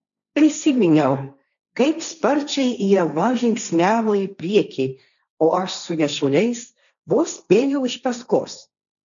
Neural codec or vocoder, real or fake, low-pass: codec, 16 kHz, 1.1 kbps, Voila-Tokenizer; fake; 7.2 kHz